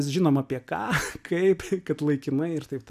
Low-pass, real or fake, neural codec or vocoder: 14.4 kHz; real; none